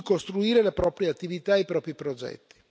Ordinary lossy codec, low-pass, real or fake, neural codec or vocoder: none; none; real; none